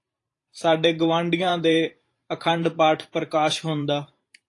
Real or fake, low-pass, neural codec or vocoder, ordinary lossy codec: fake; 10.8 kHz; vocoder, 44.1 kHz, 128 mel bands every 512 samples, BigVGAN v2; AAC, 48 kbps